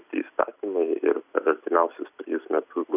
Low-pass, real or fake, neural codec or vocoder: 3.6 kHz; real; none